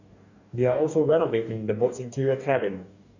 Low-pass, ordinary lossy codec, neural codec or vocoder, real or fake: 7.2 kHz; none; codec, 44.1 kHz, 2.6 kbps, DAC; fake